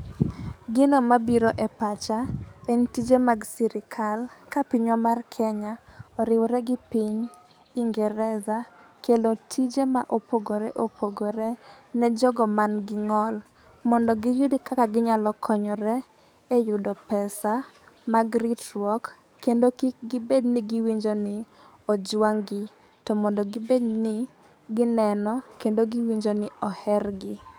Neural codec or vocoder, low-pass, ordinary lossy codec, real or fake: codec, 44.1 kHz, 7.8 kbps, DAC; none; none; fake